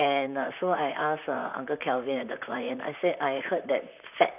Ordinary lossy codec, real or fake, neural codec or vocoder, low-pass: none; fake; vocoder, 44.1 kHz, 128 mel bands, Pupu-Vocoder; 3.6 kHz